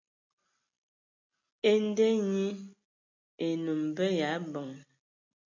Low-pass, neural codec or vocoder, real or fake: 7.2 kHz; none; real